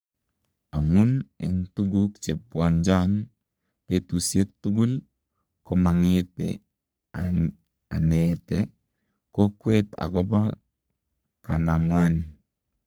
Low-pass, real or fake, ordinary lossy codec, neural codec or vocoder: none; fake; none; codec, 44.1 kHz, 3.4 kbps, Pupu-Codec